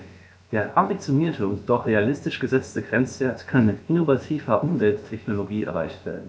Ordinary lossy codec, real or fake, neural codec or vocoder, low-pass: none; fake; codec, 16 kHz, about 1 kbps, DyCAST, with the encoder's durations; none